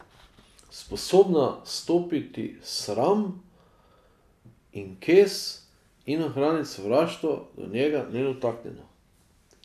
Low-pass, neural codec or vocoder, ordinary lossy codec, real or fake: 14.4 kHz; none; none; real